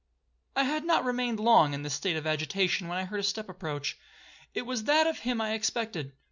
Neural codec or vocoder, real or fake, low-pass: none; real; 7.2 kHz